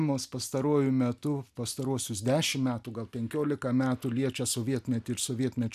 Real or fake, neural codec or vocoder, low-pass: real; none; 14.4 kHz